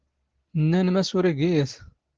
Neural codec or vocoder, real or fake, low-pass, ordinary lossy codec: none; real; 7.2 kHz; Opus, 16 kbps